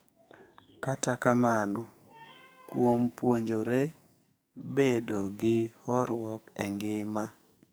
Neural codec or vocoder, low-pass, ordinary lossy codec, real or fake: codec, 44.1 kHz, 2.6 kbps, SNAC; none; none; fake